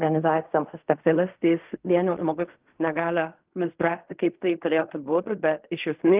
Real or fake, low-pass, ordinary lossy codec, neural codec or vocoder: fake; 3.6 kHz; Opus, 24 kbps; codec, 16 kHz in and 24 kHz out, 0.4 kbps, LongCat-Audio-Codec, fine tuned four codebook decoder